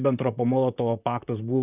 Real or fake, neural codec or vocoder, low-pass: fake; vocoder, 44.1 kHz, 128 mel bands, Pupu-Vocoder; 3.6 kHz